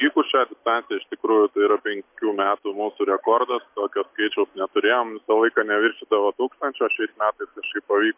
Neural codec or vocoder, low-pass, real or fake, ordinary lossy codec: none; 3.6 kHz; real; MP3, 32 kbps